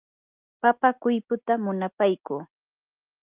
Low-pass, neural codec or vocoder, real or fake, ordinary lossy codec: 3.6 kHz; none; real; Opus, 24 kbps